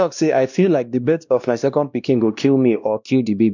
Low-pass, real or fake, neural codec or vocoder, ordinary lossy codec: 7.2 kHz; fake; codec, 16 kHz, 1 kbps, X-Codec, WavLM features, trained on Multilingual LibriSpeech; none